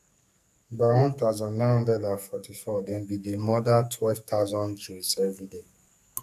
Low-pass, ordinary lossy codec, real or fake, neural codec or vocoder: 14.4 kHz; none; fake; codec, 44.1 kHz, 2.6 kbps, SNAC